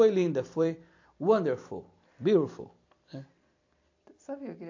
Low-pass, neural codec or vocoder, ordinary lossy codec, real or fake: 7.2 kHz; vocoder, 44.1 kHz, 128 mel bands every 256 samples, BigVGAN v2; AAC, 48 kbps; fake